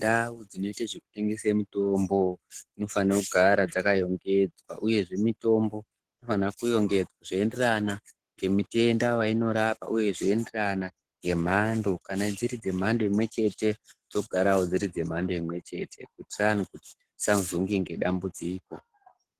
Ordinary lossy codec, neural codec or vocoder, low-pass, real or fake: Opus, 16 kbps; codec, 44.1 kHz, 7.8 kbps, Pupu-Codec; 14.4 kHz; fake